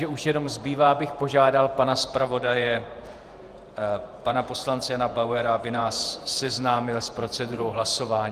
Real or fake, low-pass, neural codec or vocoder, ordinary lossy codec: fake; 14.4 kHz; vocoder, 48 kHz, 128 mel bands, Vocos; Opus, 32 kbps